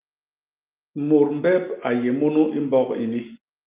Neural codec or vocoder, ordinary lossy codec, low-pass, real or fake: none; Opus, 32 kbps; 3.6 kHz; real